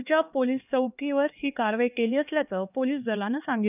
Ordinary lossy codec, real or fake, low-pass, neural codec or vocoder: none; fake; 3.6 kHz; codec, 16 kHz, 2 kbps, X-Codec, HuBERT features, trained on LibriSpeech